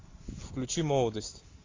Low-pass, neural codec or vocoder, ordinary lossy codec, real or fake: 7.2 kHz; none; AAC, 32 kbps; real